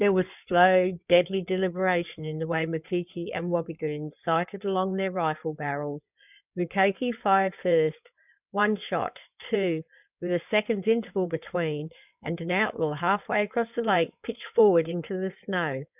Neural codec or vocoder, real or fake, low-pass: codec, 16 kHz in and 24 kHz out, 2.2 kbps, FireRedTTS-2 codec; fake; 3.6 kHz